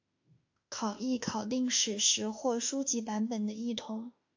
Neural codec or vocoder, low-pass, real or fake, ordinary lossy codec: autoencoder, 48 kHz, 32 numbers a frame, DAC-VAE, trained on Japanese speech; 7.2 kHz; fake; AAC, 48 kbps